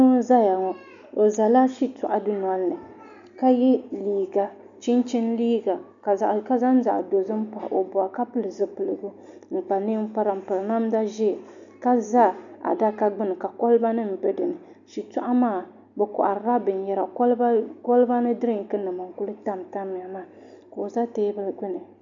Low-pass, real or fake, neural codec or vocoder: 7.2 kHz; real; none